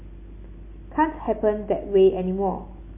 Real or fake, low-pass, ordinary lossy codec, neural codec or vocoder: real; 3.6 kHz; MP3, 24 kbps; none